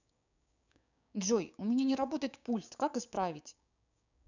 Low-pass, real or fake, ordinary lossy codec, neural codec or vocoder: 7.2 kHz; fake; none; codec, 16 kHz, 6 kbps, DAC